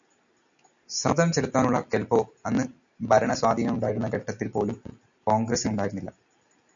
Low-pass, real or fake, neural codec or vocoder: 7.2 kHz; real; none